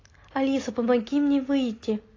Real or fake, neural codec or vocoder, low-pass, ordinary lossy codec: real; none; 7.2 kHz; AAC, 32 kbps